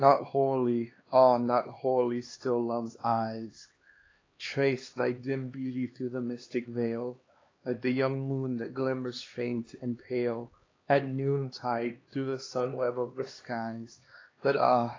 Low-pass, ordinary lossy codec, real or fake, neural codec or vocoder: 7.2 kHz; AAC, 32 kbps; fake; codec, 16 kHz, 2 kbps, X-Codec, HuBERT features, trained on LibriSpeech